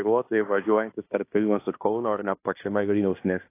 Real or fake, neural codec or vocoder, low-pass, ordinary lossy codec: fake; codec, 16 kHz in and 24 kHz out, 0.9 kbps, LongCat-Audio-Codec, fine tuned four codebook decoder; 3.6 kHz; AAC, 24 kbps